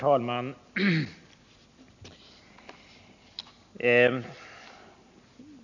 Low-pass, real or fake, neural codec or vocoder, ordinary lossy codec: 7.2 kHz; real; none; none